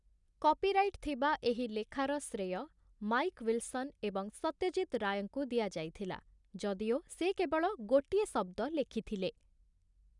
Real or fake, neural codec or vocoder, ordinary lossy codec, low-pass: real; none; none; 10.8 kHz